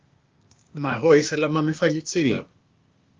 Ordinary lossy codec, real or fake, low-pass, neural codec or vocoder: Opus, 24 kbps; fake; 7.2 kHz; codec, 16 kHz, 0.8 kbps, ZipCodec